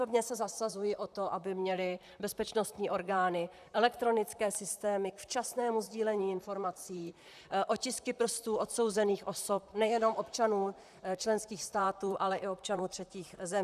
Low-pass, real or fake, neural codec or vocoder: 14.4 kHz; fake; vocoder, 44.1 kHz, 128 mel bands, Pupu-Vocoder